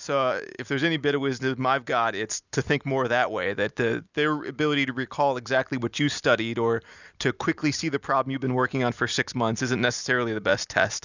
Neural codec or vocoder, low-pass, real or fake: none; 7.2 kHz; real